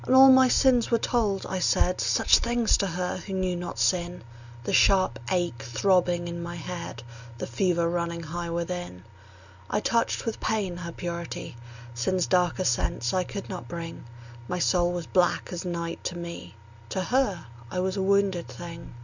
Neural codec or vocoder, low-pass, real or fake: none; 7.2 kHz; real